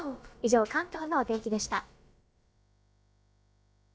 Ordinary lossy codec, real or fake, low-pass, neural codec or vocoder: none; fake; none; codec, 16 kHz, about 1 kbps, DyCAST, with the encoder's durations